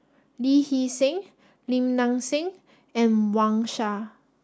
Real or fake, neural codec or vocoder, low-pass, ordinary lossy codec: real; none; none; none